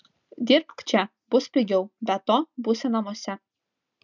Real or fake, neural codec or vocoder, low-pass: real; none; 7.2 kHz